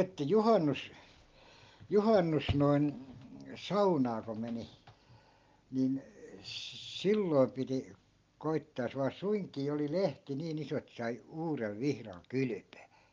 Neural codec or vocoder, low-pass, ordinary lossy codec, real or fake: none; 7.2 kHz; Opus, 32 kbps; real